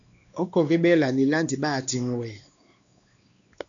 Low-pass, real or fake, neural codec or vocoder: 7.2 kHz; fake; codec, 16 kHz, 2 kbps, X-Codec, WavLM features, trained on Multilingual LibriSpeech